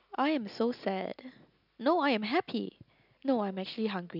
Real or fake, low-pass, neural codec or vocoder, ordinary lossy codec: real; 5.4 kHz; none; none